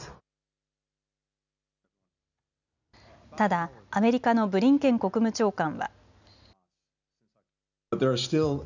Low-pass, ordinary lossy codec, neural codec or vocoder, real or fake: 7.2 kHz; none; none; real